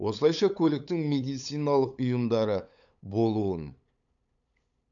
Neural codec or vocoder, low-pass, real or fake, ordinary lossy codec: codec, 16 kHz, 8 kbps, FunCodec, trained on LibriTTS, 25 frames a second; 7.2 kHz; fake; none